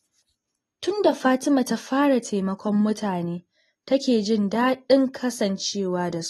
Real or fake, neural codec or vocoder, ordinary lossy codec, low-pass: real; none; AAC, 32 kbps; 19.8 kHz